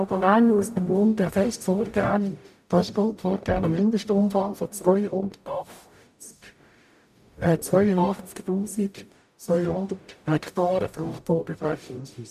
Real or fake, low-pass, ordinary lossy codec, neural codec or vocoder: fake; 14.4 kHz; none; codec, 44.1 kHz, 0.9 kbps, DAC